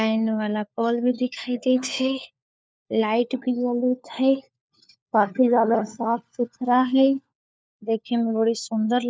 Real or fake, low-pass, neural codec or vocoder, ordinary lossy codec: fake; none; codec, 16 kHz, 4 kbps, FunCodec, trained on LibriTTS, 50 frames a second; none